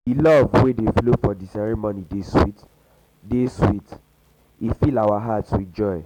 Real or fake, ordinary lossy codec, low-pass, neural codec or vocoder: real; none; 19.8 kHz; none